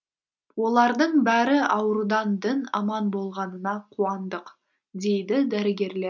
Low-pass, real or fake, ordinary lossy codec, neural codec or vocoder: 7.2 kHz; real; none; none